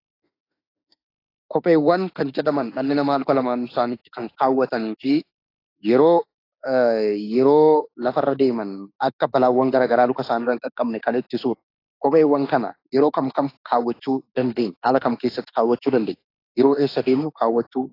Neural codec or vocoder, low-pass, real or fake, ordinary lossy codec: autoencoder, 48 kHz, 32 numbers a frame, DAC-VAE, trained on Japanese speech; 5.4 kHz; fake; AAC, 32 kbps